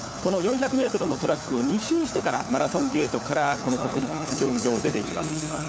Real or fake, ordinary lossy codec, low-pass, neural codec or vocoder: fake; none; none; codec, 16 kHz, 4 kbps, FunCodec, trained on LibriTTS, 50 frames a second